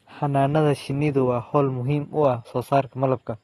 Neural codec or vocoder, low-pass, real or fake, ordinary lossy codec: none; 14.4 kHz; real; AAC, 32 kbps